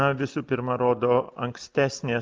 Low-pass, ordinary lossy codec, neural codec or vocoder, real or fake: 7.2 kHz; Opus, 24 kbps; none; real